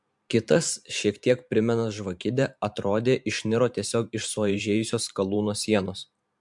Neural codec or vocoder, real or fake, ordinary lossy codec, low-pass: vocoder, 44.1 kHz, 128 mel bands every 512 samples, BigVGAN v2; fake; MP3, 64 kbps; 10.8 kHz